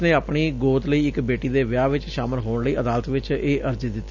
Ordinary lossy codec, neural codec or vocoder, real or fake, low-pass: none; none; real; 7.2 kHz